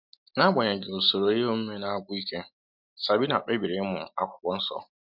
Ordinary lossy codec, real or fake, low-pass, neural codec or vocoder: MP3, 48 kbps; real; 5.4 kHz; none